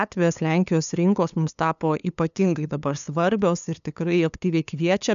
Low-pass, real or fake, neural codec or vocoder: 7.2 kHz; fake; codec, 16 kHz, 2 kbps, FunCodec, trained on LibriTTS, 25 frames a second